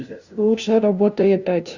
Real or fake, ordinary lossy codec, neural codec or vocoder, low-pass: fake; Opus, 64 kbps; codec, 16 kHz, 0.5 kbps, FunCodec, trained on LibriTTS, 25 frames a second; 7.2 kHz